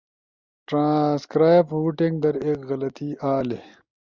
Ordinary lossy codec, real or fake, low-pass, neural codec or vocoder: Opus, 64 kbps; real; 7.2 kHz; none